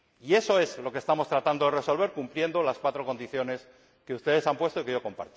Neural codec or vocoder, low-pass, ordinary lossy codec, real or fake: none; none; none; real